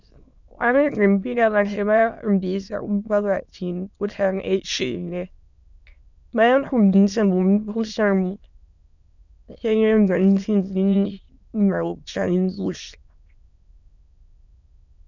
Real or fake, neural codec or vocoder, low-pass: fake; autoencoder, 22.05 kHz, a latent of 192 numbers a frame, VITS, trained on many speakers; 7.2 kHz